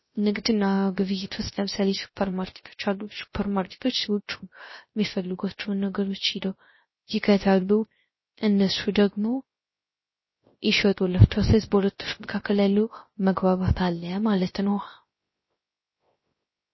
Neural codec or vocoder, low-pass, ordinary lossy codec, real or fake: codec, 16 kHz, 0.3 kbps, FocalCodec; 7.2 kHz; MP3, 24 kbps; fake